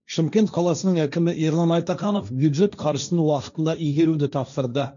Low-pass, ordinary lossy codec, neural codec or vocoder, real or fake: 7.2 kHz; none; codec, 16 kHz, 1.1 kbps, Voila-Tokenizer; fake